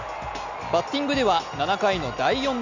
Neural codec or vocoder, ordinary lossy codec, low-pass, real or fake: none; none; 7.2 kHz; real